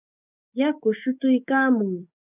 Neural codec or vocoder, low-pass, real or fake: codec, 16 kHz, 6 kbps, DAC; 3.6 kHz; fake